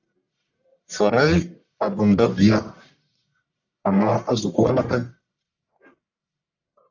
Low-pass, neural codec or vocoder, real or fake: 7.2 kHz; codec, 44.1 kHz, 1.7 kbps, Pupu-Codec; fake